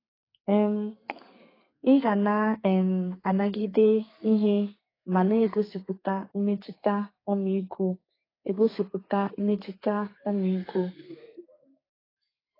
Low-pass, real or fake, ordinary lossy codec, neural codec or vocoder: 5.4 kHz; fake; AAC, 24 kbps; codec, 32 kHz, 1.9 kbps, SNAC